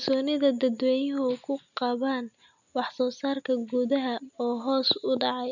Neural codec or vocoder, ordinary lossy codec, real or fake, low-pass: none; none; real; 7.2 kHz